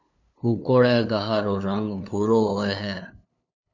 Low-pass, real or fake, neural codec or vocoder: 7.2 kHz; fake; codec, 16 kHz, 2 kbps, FunCodec, trained on LibriTTS, 25 frames a second